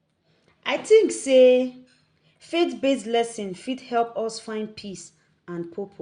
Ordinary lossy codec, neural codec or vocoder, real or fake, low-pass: Opus, 64 kbps; none; real; 9.9 kHz